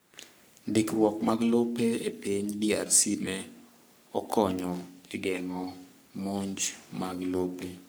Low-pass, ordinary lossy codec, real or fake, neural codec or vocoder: none; none; fake; codec, 44.1 kHz, 3.4 kbps, Pupu-Codec